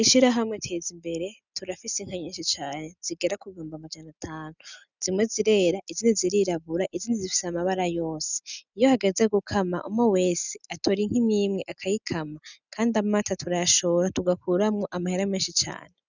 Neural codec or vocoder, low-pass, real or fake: none; 7.2 kHz; real